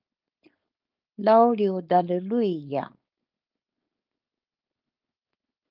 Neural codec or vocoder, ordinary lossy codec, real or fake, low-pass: codec, 16 kHz, 4.8 kbps, FACodec; Opus, 24 kbps; fake; 5.4 kHz